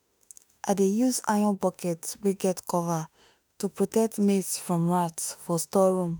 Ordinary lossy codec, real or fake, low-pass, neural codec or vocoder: none; fake; none; autoencoder, 48 kHz, 32 numbers a frame, DAC-VAE, trained on Japanese speech